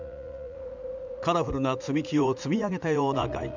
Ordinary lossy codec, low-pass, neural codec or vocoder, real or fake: none; 7.2 kHz; vocoder, 44.1 kHz, 80 mel bands, Vocos; fake